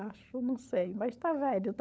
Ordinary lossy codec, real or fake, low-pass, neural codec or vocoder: none; fake; none; codec, 16 kHz, 16 kbps, FunCodec, trained on LibriTTS, 50 frames a second